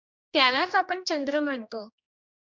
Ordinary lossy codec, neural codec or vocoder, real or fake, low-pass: MP3, 64 kbps; codec, 16 kHz, 1 kbps, X-Codec, HuBERT features, trained on general audio; fake; 7.2 kHz